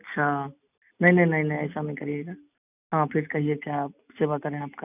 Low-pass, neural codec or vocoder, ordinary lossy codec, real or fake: 3.6 kHz; none; none; real